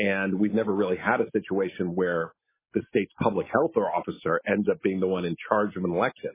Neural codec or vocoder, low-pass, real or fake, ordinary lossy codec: none; 3.6 kHz; real; MP3, 16 kbps